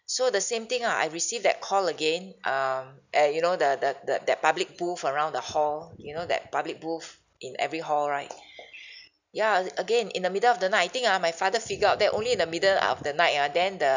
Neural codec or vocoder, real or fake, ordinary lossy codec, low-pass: none; real; none; 7.2 kHz